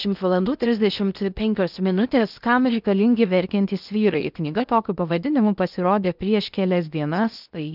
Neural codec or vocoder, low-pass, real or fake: codec, 16 kHz in and 24 kHz out, 0.8 kbps, FocalCodec, streaming, 65536 codes; 5.4 kHz; fake